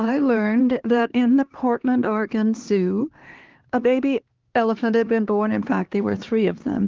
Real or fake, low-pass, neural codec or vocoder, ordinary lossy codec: fake; 7.2 kHz; codec, 16 kHz, 2 kbps, X-Codec, HuBERT features, trained on LibriSpeech; Opus, 16 kbps